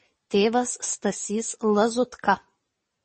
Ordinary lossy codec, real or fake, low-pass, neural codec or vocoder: MP3, 32 kbps; real; 9.9 kHz; none